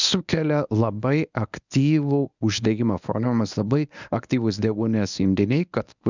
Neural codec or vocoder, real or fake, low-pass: codec, 24 kHz, 0.9 kbps, WavTokenizer, medium speech release version 1; fake; 7.2 kHz